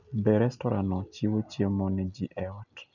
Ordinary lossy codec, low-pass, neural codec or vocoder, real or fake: none; 7.2 kHz; none; real